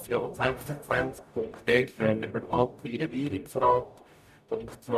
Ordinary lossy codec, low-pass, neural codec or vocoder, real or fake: none; 14.4 kHz; codec, 44.1 kHz, 0.9 kbps, DAC; fake